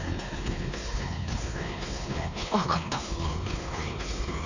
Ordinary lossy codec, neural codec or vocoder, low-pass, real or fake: none; codec, 16 kHz, 2 kbps, X-Codec, WavLM features, trained on Multilingual LibriSpeech; 7.2 kHz; fake